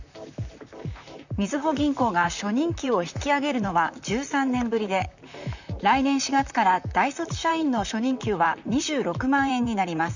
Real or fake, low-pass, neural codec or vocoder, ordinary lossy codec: fake; 7.2 kHz; vocoder, 44.1 kHz, 128 mel bands, Pupu-Vocoder; none